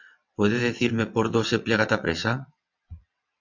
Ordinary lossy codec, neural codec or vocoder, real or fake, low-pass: MP3, 64 kbps; vocoder, 22.05 kHz, 80 mel bands, WaveNeXt; fake; 7.2 kHz